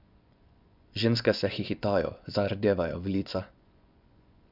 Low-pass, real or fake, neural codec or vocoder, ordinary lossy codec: 5.4 kHz; fake; vocoder, 24 kHz, 100 mel bands, Vocos; none